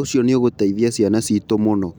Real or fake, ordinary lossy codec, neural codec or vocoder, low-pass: real; none; none; none